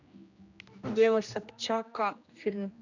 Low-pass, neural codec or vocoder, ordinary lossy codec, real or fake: 7.2 kHz; codec, 16 kHz, 1 kbps, X-Codec, HuBERT features, trained on general audio; none; fake